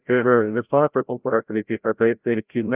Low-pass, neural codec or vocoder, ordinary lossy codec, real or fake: 3.6 kHz; codec, 16 kHz, 0.5 kbps, FreqCodec, larger model; Opus, 24 kbps; fake